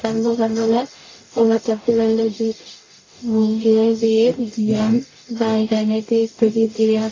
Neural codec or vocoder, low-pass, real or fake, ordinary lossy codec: codec, 44.1 kHz, 0.9 kbps, DAC; 7.2 kHz; fake; AAC, 32 kbps